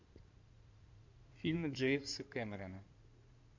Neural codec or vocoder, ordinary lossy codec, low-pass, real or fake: codec, 16 kHz in and 24 kHz out, 2.2 kbps, FireRedTTS-2 codec; MP3, 64 kbps; 7.2 kHz; fake